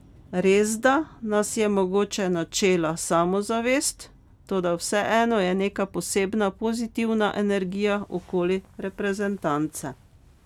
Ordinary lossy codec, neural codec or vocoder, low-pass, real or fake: none; none; 19.8 kHz; real